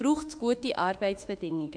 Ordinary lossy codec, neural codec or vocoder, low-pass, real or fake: AAC, 64 kbps; autoencoder, 48 kHz, 32 numbers a frame, DAC-VAE, trained on Japanese speech; 9.9 kHz; fake